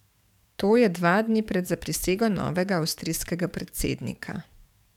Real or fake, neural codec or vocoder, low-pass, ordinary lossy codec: fake; codec, 44.1 kHz, 7.8 kbps, DAC; 19.8 kHz; none